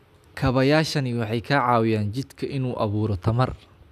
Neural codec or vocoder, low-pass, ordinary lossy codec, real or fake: none; 14.4 kHz; none; real